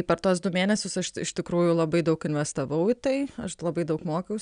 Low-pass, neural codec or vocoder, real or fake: 9.9 kHz; none; real